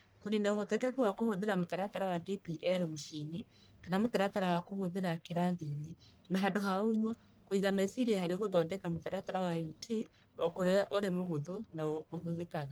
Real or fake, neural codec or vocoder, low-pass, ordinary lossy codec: fake; codec, 44.1 kHz, 1.7 kbps, Pupu-Codec; none; none